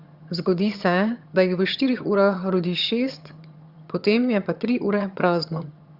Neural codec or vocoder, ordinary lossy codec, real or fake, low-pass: vocoder, 22.05 kHz, 80 mel bands, HiFi-GAN; Opus, 64 kbps; fake; 5.4 kHz